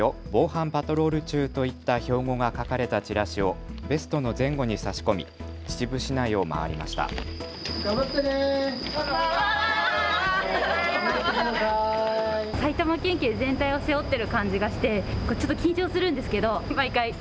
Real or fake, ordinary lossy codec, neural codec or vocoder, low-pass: real; none; none; none